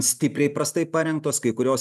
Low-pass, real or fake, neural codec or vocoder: 14.4 kHz; real; none